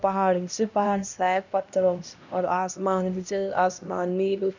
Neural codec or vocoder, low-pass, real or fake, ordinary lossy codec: codec, 16 kHz, 1 kbps, X-Codec, HuBERT features, trained on LibriSpeech; 7.2 kHz; fake; none